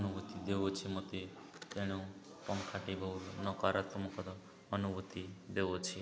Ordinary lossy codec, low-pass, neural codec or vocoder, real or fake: none; none; none; real